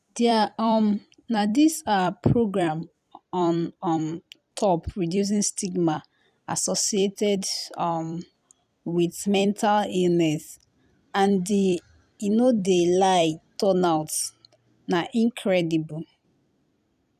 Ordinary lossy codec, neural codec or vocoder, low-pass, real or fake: none; vocoder, 44.1 kHz, 128 mel bands every 256 samples, BigVGAN v2; 14.4 kHz; fake